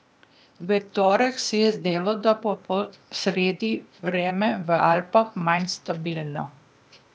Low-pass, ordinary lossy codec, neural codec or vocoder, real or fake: none; none; codec, 16 kHz, 0.8 kbps, ZipCodec; fake